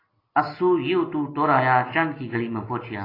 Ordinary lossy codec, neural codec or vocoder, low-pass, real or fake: AAC, 24 kbps; none; 5.4 kHz; real